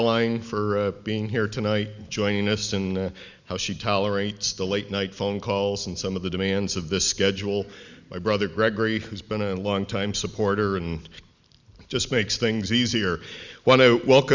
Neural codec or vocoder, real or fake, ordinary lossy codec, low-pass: none; real; Opus, 64 kbps; 7.2 kHz